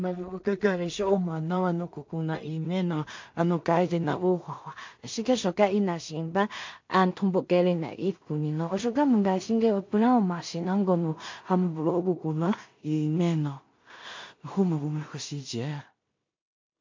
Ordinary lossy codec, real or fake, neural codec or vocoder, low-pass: MP3, 48 kbps; fake; codec, 16 kHz in and 24 kHz out, 0.4 kbps, LongCat-Audio-Codec, two codebook decoder; 7.2 kHz